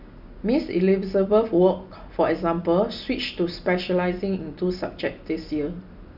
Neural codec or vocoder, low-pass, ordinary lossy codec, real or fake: none; 5.4 kHz; none; real